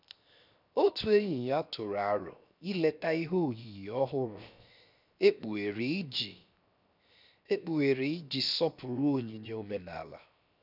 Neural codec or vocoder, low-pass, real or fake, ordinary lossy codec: codec, 16 kHz, 0.7 kbps, FocalCodec; 5.4 kHz; fake; none